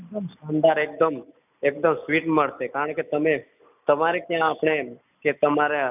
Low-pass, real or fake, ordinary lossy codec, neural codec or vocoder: 3.6 kHz; real; none; none